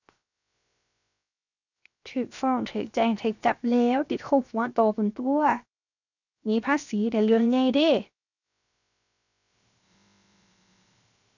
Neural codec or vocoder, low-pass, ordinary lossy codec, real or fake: codec, 16 kHz, 0.7 kbps, FocalCodec; 7.2 kHz; none; fake